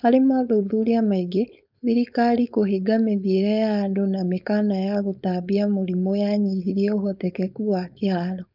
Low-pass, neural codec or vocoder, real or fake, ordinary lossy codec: 5.4 kHz; codec, 16 kHz, 4.8 kbps, FACodec; fake; none